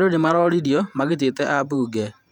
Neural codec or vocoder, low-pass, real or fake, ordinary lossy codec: vocoder, 48 kHz, 128 mel bands, Vocos; 19.8 kHz; fake; none